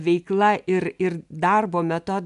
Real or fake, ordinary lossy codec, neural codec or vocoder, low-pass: real; AAC, 96 kbps; none; 10.8 kHz